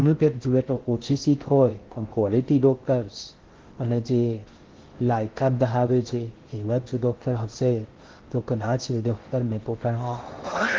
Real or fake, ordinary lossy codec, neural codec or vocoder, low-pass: fake; Opus, 16 kbps; codec, 16 kHz in and 24 kHz out, 0.6 kbps, FocalCodec, streaming, 4096 codes; 7.2 kHz